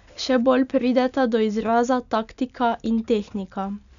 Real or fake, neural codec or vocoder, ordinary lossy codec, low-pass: real; none; none; 7.2 kHz